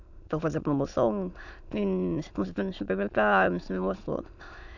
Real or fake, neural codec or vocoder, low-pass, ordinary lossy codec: fake; autoencoder, 22.05 kHz, a latent of 192 numbers a frame, VITS, trained on many speakers; 7.2 kHz; none